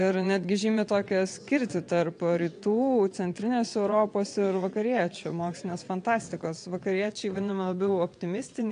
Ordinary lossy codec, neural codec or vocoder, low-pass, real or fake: AAC, 64 kbps; vocoder, 22.05 kHz, 80 mel bands, WaveNeXt; 9.9 kHz; fake